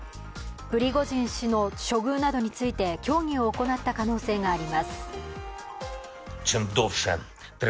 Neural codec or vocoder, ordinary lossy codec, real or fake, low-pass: none; none; real; none